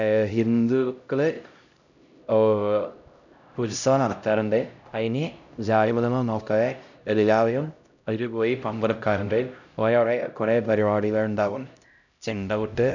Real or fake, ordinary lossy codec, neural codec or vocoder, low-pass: fake; none; codec, 16 kHz, 0.5 kbps, X-Codec, HuBERT features, trained on LibriSpeech; 7.2 kHz